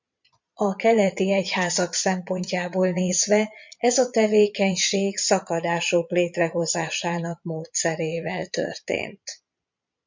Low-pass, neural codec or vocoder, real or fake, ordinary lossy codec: 7.2 kHz; vocoder, 22.05 kHz, 80 mel bands, Vocos; fake; MP3, 64 kbps